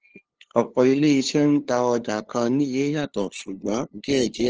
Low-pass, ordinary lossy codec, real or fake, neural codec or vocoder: 7.2 kHz; Opus, 16 kbps; fake; codec, 16 kHz, 2 kbps, FunCodec, trained on LibriTTS, 25 frames a second